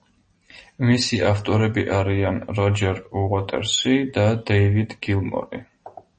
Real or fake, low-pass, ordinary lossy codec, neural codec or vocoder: real; 10.8 kHz; MP3, 32 kbps; none